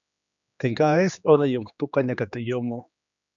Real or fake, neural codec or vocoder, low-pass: fake; codec, 16 kHz, 2 kbps, X-Codec, HuBERT features, trained on general audio; 7.2 kHz